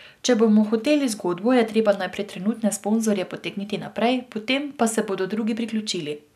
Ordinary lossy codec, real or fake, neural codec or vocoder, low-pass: none; real; none; 14.4 kHz